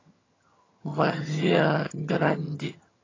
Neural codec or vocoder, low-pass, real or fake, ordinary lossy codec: vocoder, 22.05 kHz, 80 mel bands, HiFi-GAN; 7.2 kHz; fake; AAC, 32 kbps